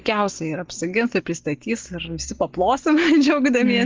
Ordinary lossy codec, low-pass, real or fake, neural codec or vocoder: Opus, 16 kbps; 7.2 kHz; real; none